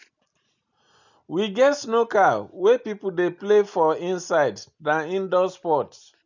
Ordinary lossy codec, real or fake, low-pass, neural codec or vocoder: none; real; 7.2 kHz; none